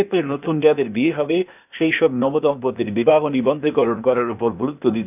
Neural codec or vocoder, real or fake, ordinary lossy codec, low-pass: codec, 16 kHz, 0.8 kbps, ZipCodec; fake; none; 3.6 kHz